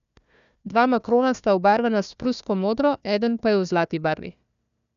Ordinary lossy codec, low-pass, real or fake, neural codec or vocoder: none; 7.2 kHz; fake; codec, 16 kHz, 1 kbps, FunCodec, trained on Chinese and English, 50 frames a second